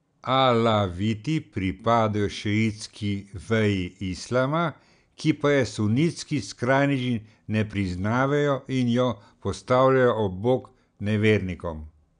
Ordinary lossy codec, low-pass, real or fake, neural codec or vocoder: none; 9.9 kHz; real; none